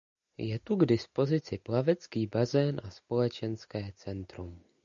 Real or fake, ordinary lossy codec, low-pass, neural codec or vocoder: real; MP3, 96 kbps; 7.2 kHz; none